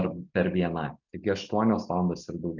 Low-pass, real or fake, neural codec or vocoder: 7.2 kHz; real; none